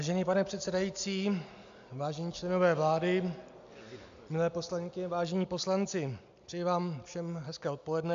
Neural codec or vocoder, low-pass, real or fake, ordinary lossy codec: none; 7.2 kHz; real; AAC, 64 kbps